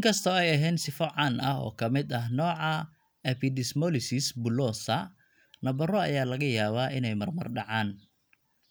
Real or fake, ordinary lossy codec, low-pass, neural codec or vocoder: real; none; none; none